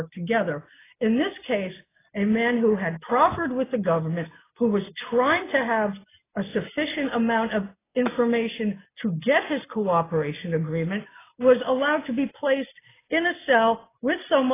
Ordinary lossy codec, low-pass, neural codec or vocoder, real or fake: AAC, 16 kbps; 3.6 kHz; none; real